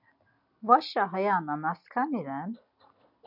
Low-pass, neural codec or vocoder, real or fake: 5.4 kHz; none; real